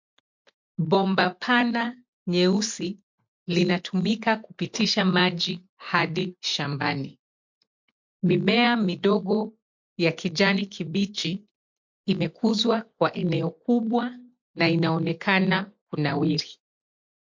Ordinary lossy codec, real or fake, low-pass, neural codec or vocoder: MP3, 48 kbps; real; 7.2 kHz; none